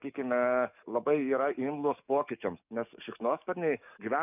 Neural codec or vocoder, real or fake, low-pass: codec, 44.1 kHz, 7.8 kbps, DAC; fake; 3.6 kHz